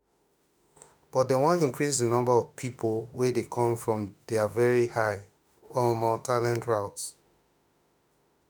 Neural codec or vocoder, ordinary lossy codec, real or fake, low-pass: autoencoder, 48 kHz, 32 numbers a frame, DAC-VAE, trained on Japanese speech; none; fake; none